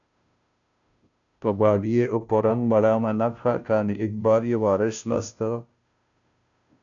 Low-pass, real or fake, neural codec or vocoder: 7.2 kHz; fake; codec, 16 kHz, 0.5 kbps, FunCodec, trained on Chinese and English, 25 frames a second